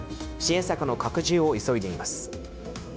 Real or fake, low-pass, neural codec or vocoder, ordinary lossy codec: fake; none; codec, 16 kHz, 0.9 kbps, LongCat-Audio-Codec; none